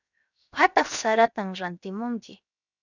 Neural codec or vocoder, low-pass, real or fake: codec, 16 kHz, 0.7 kbps, FocalCodec; 7.2 kHz; fake